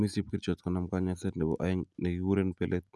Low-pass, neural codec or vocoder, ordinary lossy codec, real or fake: none; none; none; real